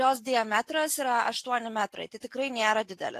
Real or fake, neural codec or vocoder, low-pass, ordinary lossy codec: real; none; 14.4 kHz; AAC, 64 kbps